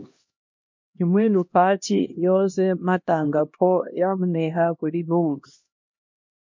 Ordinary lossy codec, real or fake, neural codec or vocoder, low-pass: MP3, 48 kbps; fake; codec, 16 kHz, 1 kbps, X-Codec, HuBERT features, trained on LibriSpeech; 7.2 kHz